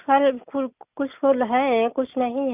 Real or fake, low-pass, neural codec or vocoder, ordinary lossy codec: real; 3.6 kHz; none; none